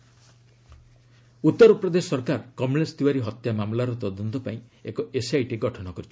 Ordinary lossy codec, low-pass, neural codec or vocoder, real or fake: none; none; none; real